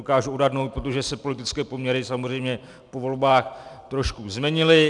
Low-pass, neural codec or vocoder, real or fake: 10.8 kHz; none; real